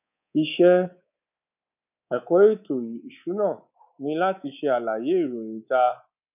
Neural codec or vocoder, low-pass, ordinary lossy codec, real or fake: codec, 24 kHz, 3.1 kbps, DualCodec; 3.6 kHz; none; fake